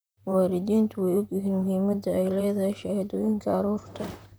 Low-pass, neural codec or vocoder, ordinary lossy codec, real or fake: none; vocoder, 44.1 kHz, 128 mel bands, Pupu-Vocoder; none; fake